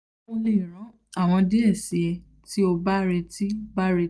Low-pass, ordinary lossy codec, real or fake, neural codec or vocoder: none; none; real; none